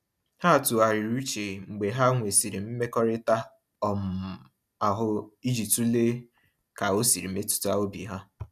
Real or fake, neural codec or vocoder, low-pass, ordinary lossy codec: real; none; 14.4 kHz; none